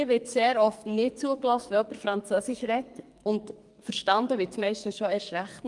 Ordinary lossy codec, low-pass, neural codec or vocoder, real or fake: Opus, 32 kbps; 10.8 kHz; codec, 44.1 kHz, 2.6 kbps, SNAC; fake